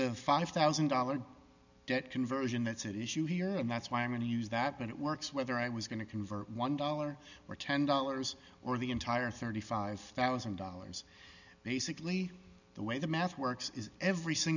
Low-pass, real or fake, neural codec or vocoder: 7.2 kHz; real; none